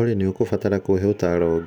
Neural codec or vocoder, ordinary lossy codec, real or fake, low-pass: none; none; real; 19.8 kHz